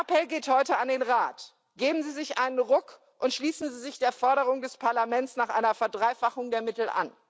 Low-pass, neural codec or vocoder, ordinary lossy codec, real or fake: none; none; none; real